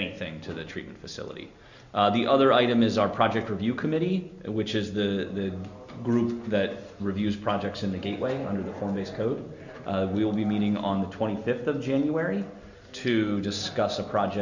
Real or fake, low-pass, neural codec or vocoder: real; 7.2 kHz; none